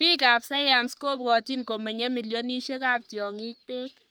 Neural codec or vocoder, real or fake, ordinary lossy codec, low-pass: codec, 44.1 kHz, 3.4 kbps, Pupu-Codec; fake; none; none